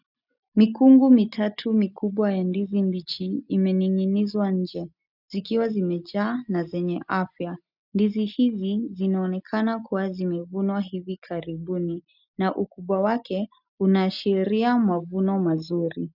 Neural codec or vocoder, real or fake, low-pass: none; real; 5.4 kHz